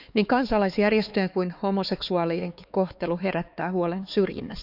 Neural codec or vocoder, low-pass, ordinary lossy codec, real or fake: codec, 16 kHz, 4 kbps, X-Codec, HuBERT features, trained on LibriSpeech; 5.4 kHz; none; fake